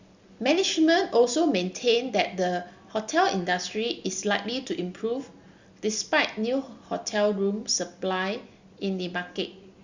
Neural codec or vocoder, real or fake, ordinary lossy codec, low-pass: none; real; Opus, 64 kbps; 7.2 kHz